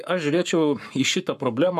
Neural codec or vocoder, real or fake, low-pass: codec, 44.1 kHz, 7.8 kbps, Pupu-Codec; fake; 14.4 kHz